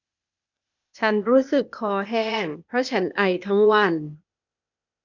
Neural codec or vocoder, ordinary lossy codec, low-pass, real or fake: codec, 16 kHz, 0.8 kbps, ZipCodec; none; 7.2 kHz; fake